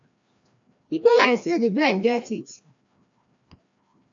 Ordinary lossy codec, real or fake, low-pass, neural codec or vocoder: AAC, 64 kbps; fake; 7.2 kHz; codec, 16 kHz, 1 kbps, FreqCodec, larger model